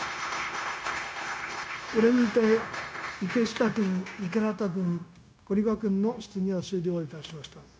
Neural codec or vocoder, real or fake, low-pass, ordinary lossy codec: codec, 16 kHz, 0.9 kbps, LongCat-Audio-Codec; fake; none; none